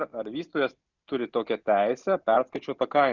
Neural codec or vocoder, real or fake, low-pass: none; real; 7.2 kHz